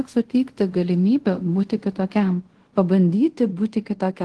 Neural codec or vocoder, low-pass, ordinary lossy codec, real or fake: codec, 24 kHz, 0.5 kbps, DualCodec; 10.8 kHz; Opus, 16 kbps; fake